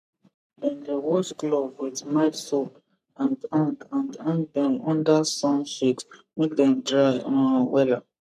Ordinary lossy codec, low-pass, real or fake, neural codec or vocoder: none; 14.4 kHz; fake; codec, 44.1 kHz, 3.4 kbps, Pupu-Codec